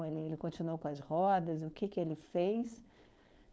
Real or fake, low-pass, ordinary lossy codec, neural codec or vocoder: fake; none; none; codec, 16 kHz, 4.8 kbps, FACodec